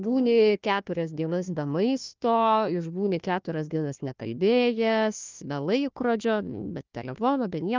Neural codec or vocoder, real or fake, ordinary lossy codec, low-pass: codec, 16 kHz, 1 kbps, FunCodec, trained on Chinese and English, 50 frames a second; fake; Opus, 24 kbps; 7.2 kHz